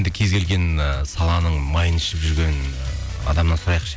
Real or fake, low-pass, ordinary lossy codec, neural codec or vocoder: real; none; none; none